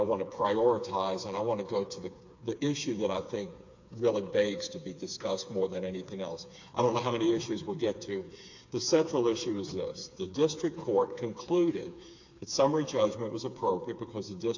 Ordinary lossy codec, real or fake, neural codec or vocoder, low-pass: AAC, 48 kbps; fake; codec, 16 kHz, 4 kbps, FreqCodec, smaller model; 7.2 kHz